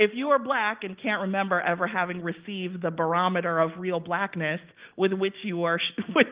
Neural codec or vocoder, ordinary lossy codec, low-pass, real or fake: none; Opus, 32 kbps; 3.6 kHz; real